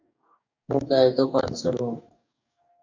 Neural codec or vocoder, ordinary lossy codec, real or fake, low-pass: codec, 44.1 kHz, 2.6 kbps, DAC; MP3, 64 kbps; fake; 7.2 kHz